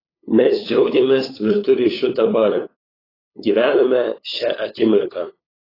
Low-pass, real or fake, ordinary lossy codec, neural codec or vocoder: 5.4 kHz; fake; AAC, 24 kbps; codec, 16 kHz, 8 kbps, FunCodec, trained on LibriTTS, 25 frames a second